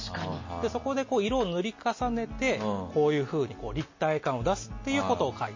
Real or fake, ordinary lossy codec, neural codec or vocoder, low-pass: real; MP3, 32 kbps; none; 7.2 kHz